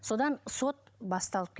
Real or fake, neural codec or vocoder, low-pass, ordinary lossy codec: fake; codec, 16 kHz, 16 kbps, FunCodec, trained on Chinese and English, 50 frames a second; none; none